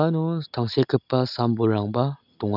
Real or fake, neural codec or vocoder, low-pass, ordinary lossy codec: real; none; 5.4 kHz; none